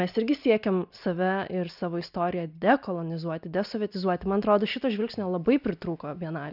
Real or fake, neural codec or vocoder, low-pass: real; none; 5.4 kHz